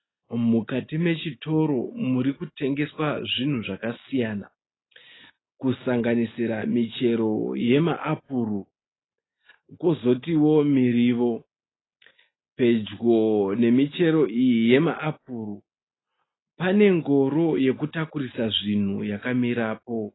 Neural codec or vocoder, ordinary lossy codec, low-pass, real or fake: none; AAC, 16 kbps; 7.2 kHz; real